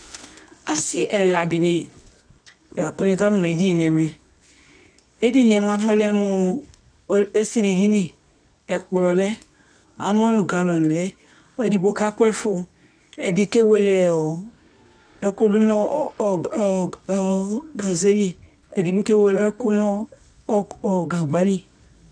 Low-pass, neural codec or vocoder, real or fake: 9.9 kHz; codec, 24 kHz, 0.9 kbps, WavTokenizer, medium music audio release; fake